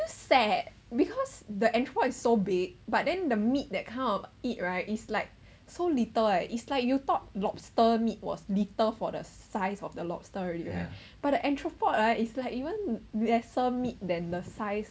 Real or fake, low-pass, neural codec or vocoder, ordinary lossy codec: real; none; none; none